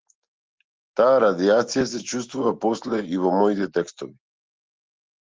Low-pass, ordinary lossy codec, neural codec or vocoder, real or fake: 7.2 kHz; Opus, 16 kbps; none; real